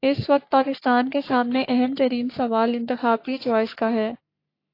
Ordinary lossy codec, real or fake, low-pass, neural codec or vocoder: AAC, 32 kbps; fake; 5.4 kHz; codec, 44.1 kHz, 3.4 kbps, Pupu-Codec